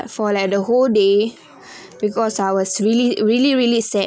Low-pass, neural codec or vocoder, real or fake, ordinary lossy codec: none; none; real; none